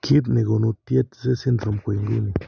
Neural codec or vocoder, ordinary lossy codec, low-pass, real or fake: none; none; 7.2 kHz; real